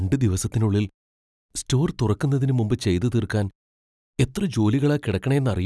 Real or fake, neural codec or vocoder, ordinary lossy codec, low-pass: real; none; none; none